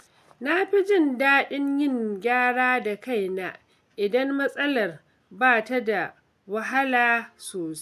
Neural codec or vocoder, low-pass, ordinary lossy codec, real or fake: none; 14.4 kHz; AAC, 96 kbps; real